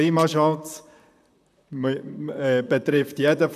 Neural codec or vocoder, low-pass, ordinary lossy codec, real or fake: vocoder, 44.1 kHz, 128 mel bands, Pupu-Vocoder; 14.4 kHz; none; fake